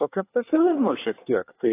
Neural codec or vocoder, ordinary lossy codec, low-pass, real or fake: codec, 24 kHz, 1 kbps, SNAC; AAC, 24 kbps; 3.6 kHz; fake